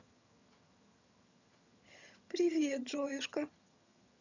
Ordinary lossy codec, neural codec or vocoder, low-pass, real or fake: none; vocoder, 22.05 kHz, 80 mel bands, HiFi-GAN; 7.2 kHz; fake